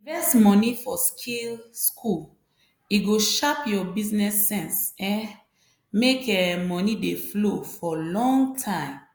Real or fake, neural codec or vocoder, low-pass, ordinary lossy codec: real; none; none; none